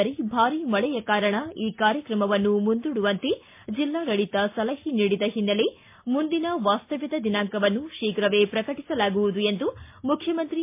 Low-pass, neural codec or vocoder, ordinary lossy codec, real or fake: 3.6 kHz; none; MP3, 24 kbps; real